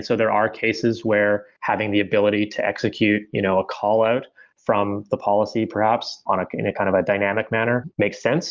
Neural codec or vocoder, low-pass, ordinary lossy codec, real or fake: none; 7.2 kHz; Opus, 24 kbps; real